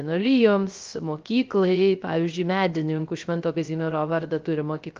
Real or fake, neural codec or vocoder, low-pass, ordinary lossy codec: fake; codec, 16 kHz, 0.3 kbps, FocalCodec; 7.2 kHz; Opus, 32 kbps